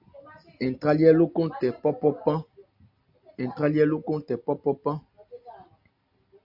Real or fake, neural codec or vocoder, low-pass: real; none; 5.4 kHz